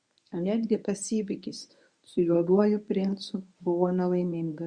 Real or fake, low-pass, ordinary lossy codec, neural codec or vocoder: fake; 9.9 kHz; Opus, 64 kbps; codec, 24 kHz, 0.9 kbps, WavTokenizer, medium speech release version 1